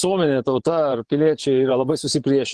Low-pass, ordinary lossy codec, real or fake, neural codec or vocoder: 10.8 kHz; Opus, 16 kbps; fake; vocoder, 24 kHz, 100 mel bands, Vocos